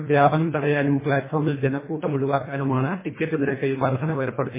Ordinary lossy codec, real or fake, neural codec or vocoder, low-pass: MP3, 16 kbps; fake; codec, 24 kHz, 1.5 kbps, HILCodec; 3.6 kHz